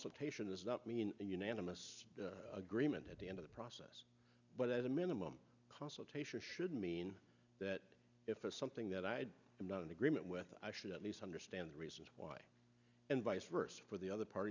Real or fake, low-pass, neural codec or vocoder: real; 7.2 kHz; none